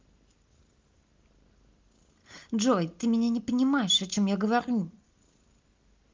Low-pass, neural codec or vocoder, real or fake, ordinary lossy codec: 7.2 kHz; none; real; Opus, 32 kbps